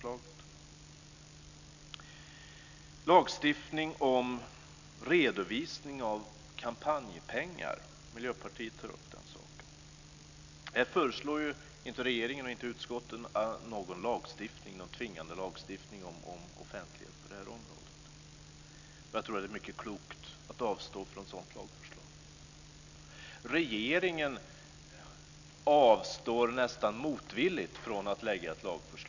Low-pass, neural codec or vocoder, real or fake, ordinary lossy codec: 7.2 kHz; none; real; none